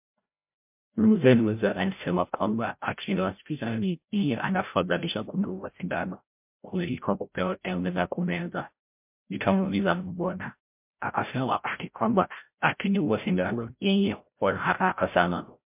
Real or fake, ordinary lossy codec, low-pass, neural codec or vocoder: fake; MP3, 32 kbps; 3.6 kHz; codec, 16 kHz, 0.5 kbps, FreqCodec, larger model